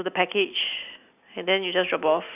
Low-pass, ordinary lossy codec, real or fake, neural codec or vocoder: 3.6 kHz; none; real; none